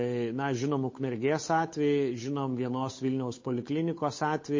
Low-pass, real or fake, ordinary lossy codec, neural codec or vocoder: 7.2 kHz; fake; MP3, 32 kbps; codec, 16 kHz, 8 kbps, FunCodec, trained on Chinese and English, 25 frames a second